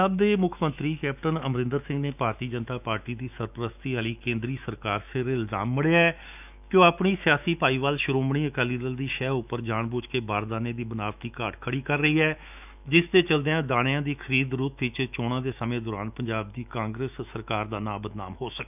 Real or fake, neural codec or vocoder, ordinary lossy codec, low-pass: fake; autoencoder, 48 kHz, 128 numbers a frame, DAC-VAE, trained on Japanese speech; none; 3.6 kHz